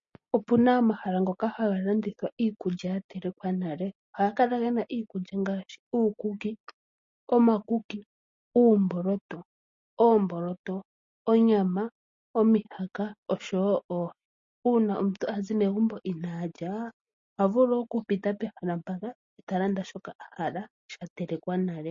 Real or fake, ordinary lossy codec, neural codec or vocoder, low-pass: real; MP3, 32 kbps; none; 7.2 kHz